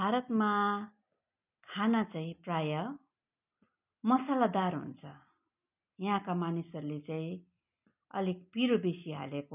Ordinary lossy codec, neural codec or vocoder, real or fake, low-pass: none; none; real; 3.6 kHz